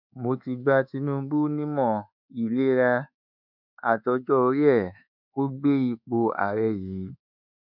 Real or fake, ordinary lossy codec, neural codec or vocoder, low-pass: fake; none; codec, 24 kHz, 1.2 kbps, DualCodec; 5.4 kHz